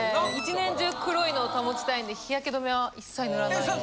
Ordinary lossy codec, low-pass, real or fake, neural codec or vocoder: none; none; real; none